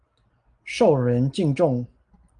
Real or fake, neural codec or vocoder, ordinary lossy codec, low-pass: real; none; Opus, 32 kbps; 9.9 kHz